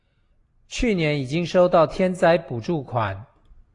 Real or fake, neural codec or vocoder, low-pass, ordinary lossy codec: real; none; 10.8 kHz; AAC, 48 kbps